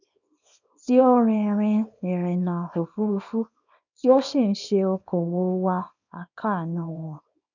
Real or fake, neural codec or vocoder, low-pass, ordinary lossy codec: fake; codec, 24 kHz, 0.9 kbps, WavTokenizer, small release; 7.2 kHz; none